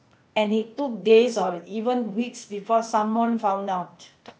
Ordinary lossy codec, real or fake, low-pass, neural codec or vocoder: none; fake; none; codec, 16 kHz, 0.8 kbps, ZipCodec